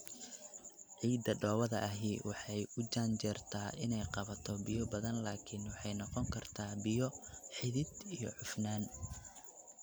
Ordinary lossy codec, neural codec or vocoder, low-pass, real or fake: none; none; none; real